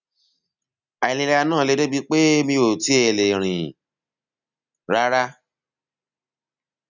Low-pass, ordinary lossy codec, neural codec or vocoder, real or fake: 7.2 kHz; none; none; real